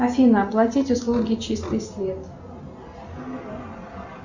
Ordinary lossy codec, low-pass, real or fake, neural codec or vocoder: Opus, 64 kbps; 7.2 kHz; real; none